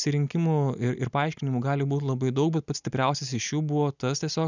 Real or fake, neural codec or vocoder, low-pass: real; none; 7.2 kHz